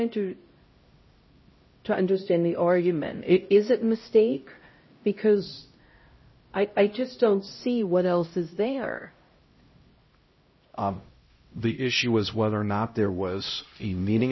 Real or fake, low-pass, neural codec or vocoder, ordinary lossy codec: fake; 7.2 kHz; codec, 16 kHz, 0.5 kbps, X-Codec, HuBERT features, trained on LibriSpeech; MP3, 24 kbps